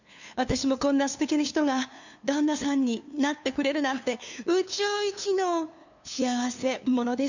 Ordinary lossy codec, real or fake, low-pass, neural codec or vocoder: none; fake; 7.2 kHz; codec, 16 kHz, 2 kbps, FunCodec, trained on LibriTTS, 25 frames a second